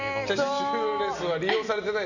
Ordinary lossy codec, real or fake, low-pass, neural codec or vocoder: none; real; 7.2 kHz; none